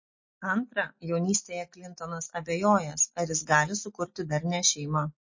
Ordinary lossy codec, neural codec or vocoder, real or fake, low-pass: MP3, 32 kbps; none; real; 7.2 kHz